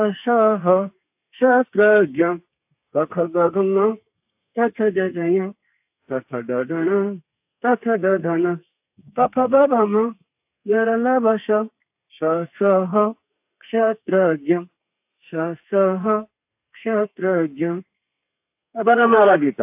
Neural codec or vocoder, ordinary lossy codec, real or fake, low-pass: codec, 32 kHz, 1.9 kbps, SNAC; AAC, 32 kbps; fake; 3.6 kHz